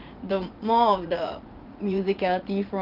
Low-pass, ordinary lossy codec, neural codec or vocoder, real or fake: 5.4 kHz; Opus, 24 kbps; vocoder, 44.1 kHz, 80 mel bands, Vocos; fake